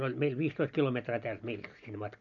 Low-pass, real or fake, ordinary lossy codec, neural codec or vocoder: 7.2 kHz; real; none; none